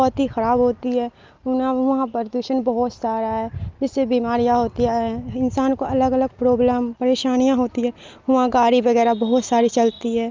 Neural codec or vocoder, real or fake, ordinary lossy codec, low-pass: none; real; Opus, 24 kbps; 7.2 kHz